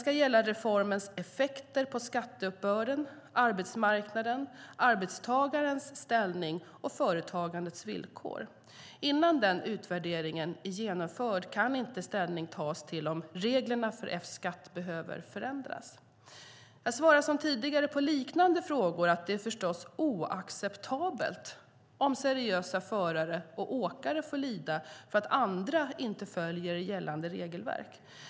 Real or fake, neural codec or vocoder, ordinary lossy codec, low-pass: real; none; none; none